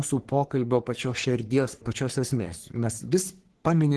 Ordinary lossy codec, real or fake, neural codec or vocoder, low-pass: Opus, 16 kbps; fake; codec, 44.1 kHz, 3.4 kbps, Pupu-Codec; 10.8 kHz